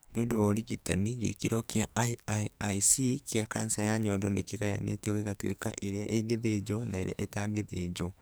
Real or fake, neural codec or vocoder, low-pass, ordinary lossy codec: fake; codec, 44.1 kHz, 2.6 kbps, SNAC; none; none